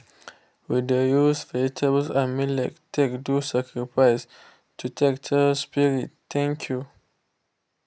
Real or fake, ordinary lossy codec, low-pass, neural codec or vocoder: real; none; none; none